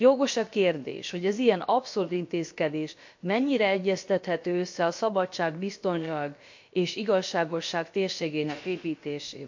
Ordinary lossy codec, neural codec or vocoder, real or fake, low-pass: MP3, 48 kbps; codec, 16 kHz, about 1 kbps, DyCAST, with the encoder's durations; fake; 7.2 kHz